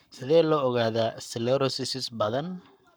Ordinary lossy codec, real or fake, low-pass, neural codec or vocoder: none; fake; none; codec, 44.1 kHz, 7.8 kbps, Pupu-Codec